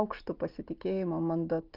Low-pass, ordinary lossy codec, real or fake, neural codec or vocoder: 5.4 kHz; Opus, 32 kbps; real; none